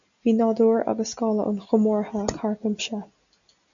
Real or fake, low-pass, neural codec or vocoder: real; 7.2 kHz; none